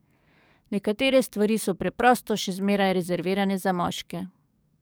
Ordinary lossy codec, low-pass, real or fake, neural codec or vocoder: none; none; fake; codec, 44.1 kHz, 7.8 kbps, DAC